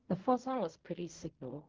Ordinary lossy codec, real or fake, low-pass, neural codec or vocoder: Opus, 16 kbps; fake; 7.2 kHz; codec, 16 kHz in and 24 kHz out, 0.4 kbps, LongCat-Audio-Codec, fine tuned four codebook decoder